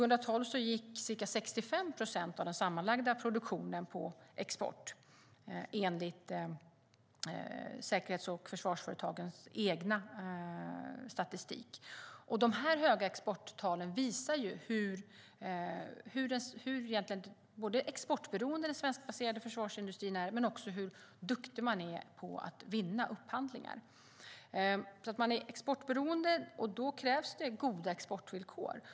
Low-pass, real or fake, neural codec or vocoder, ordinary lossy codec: none; real; none; none